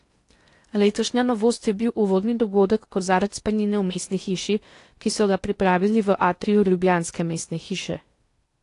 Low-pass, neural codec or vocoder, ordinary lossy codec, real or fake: 10.8 kHz; codec, 16 kHz in and 24 kHz out, 0.8 kbps, FocalCodec, streaming, 65536 codes; AAC, 48 kbps; fake